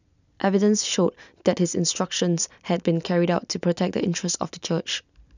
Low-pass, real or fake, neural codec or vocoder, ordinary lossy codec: 7.2 kHz; real; none; none